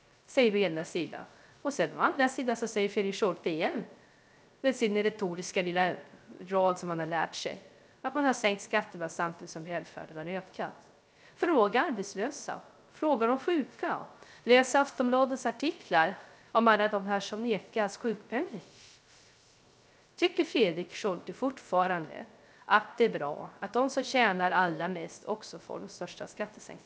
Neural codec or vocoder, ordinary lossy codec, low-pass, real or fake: codec, 16 kHz, 0.3 kbps, FocalCodec; none; none; fake